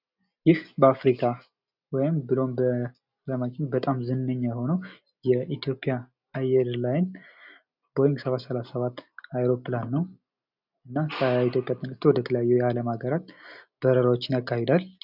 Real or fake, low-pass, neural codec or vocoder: real; 5.4 kHz; none